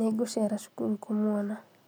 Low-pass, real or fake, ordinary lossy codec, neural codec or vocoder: none; real; none; none